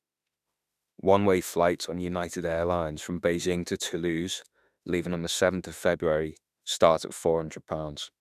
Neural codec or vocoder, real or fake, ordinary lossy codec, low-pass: autoencoder, 48 kHz, 32 numbers a frame, DAC-VAE, trained on Japanese speech; fake; none; 14.4 kHz